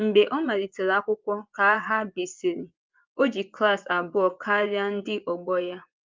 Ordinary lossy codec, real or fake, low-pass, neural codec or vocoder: Opus, 24 kbps; fake; 7.2 kHz; vocoder, 24 kHz, 100 mel bands, Vocos